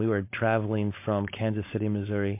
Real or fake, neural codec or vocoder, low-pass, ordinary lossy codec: fake; codec, 16 kHz, 4.8 kbps, FACodec; 3.6 kHz; MP3, 24 kbps